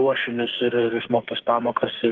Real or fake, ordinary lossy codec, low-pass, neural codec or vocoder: fake; Opus, 16 kbps; 7.2 kHz; codec, 44.1 kHz, 2.6 kbps, SNAC